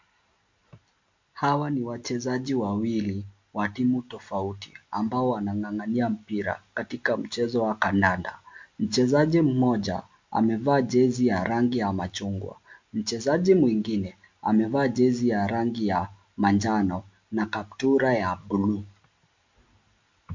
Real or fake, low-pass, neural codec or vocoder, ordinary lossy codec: real; 7.2 kHz; none; MP3, 48 kbps